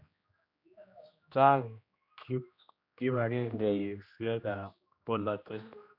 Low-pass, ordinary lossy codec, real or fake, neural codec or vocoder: 5.4 kHz; none; fake; codec, 16 kHz, 1 kbps, X-Codec, HuBERT features, trained on general audio